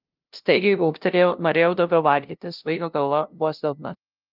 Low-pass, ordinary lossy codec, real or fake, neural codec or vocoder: 5.4 kHz; Opus, 32 kbps; fake; codec, 16 kHz, 0.5 kbps, FunCodec, trained on LibriTTS, 25 frames a second